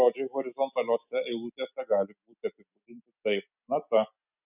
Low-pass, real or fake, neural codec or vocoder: 3.6 kHz; real; none